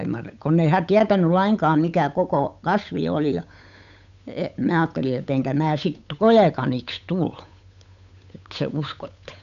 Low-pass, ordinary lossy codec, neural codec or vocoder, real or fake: 7.2 kHz; none; codec, 16 kHz, 8 kbps, FunCodec, trained on Chinese and English, 25 frames a second; fake